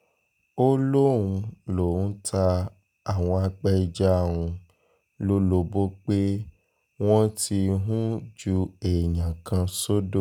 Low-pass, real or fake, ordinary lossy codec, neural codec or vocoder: 19.8 kHz; real; none; none